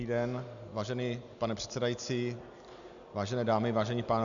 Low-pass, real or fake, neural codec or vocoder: 7.2 kHz; real; none